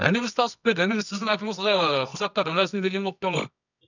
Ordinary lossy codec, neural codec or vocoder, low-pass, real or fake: none; codec, 24 kHz, 0.9 kbps, WavTokenizer, medium music audio release; 7.2 kHz; fake